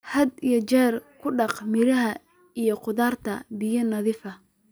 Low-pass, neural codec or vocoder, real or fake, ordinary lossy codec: none; none; real; none